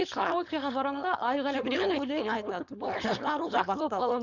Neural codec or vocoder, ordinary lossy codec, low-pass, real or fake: codec, 16 kHz, 4.8 kbps, FACodec; none; 7.2 kHz; fake